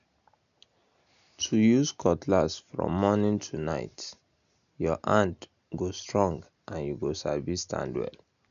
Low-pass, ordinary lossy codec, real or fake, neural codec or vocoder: 7.2 kHz; none; real; none